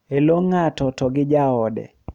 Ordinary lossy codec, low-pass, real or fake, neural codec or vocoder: none; 19.8 kHz; real; none